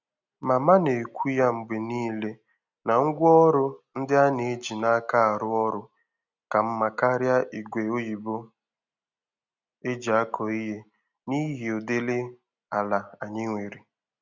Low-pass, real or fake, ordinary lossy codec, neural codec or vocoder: 7.2 kHz; real; none; none